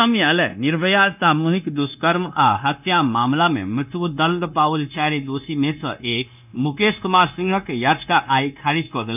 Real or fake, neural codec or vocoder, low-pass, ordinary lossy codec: fake; codec, 16 kHz, 0.9 kbps, LongCat-Audio-Codec; 3.6 kHz; none